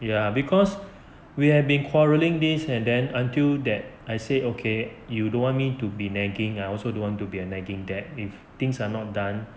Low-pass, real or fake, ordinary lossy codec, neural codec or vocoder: none; real; none; none